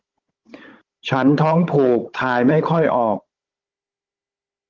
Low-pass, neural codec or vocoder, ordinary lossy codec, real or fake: 7.2 kHz; codec, 16 kHz, 16 kbps, FunCodec, trained on Chinese and English, 50 frames a second; Opus, 16 kbps; fake